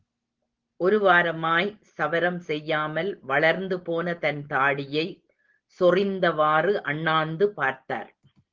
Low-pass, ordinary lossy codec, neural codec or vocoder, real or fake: 7.2 kHz; Opus, 16 kbps; none; real